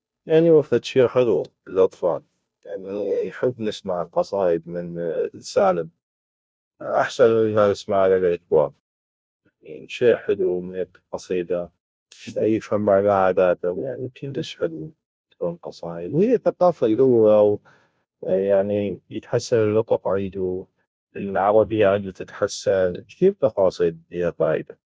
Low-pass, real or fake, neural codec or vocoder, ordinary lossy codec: none; fake; codec, 16 kHz, 0.5 kbps, FunCodec, trained on Chinese and English, 25 frames a second; none